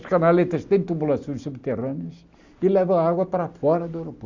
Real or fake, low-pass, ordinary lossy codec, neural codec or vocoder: real; 7.2 kHz; Opus, 64 kbps; none